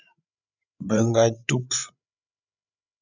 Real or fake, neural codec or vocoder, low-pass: fake; codec, 16 kHz, 8 kbps, FreqCodec, larger model; 7.2 kHz